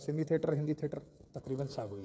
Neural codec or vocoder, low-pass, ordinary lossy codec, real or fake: codec, 16 kHz, 16 kbps, FreqCodec, smaller model; none; none; fake